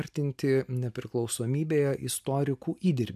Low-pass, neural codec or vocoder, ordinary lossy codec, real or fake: 14.4 kHz; none; MP3, 96 kbps; real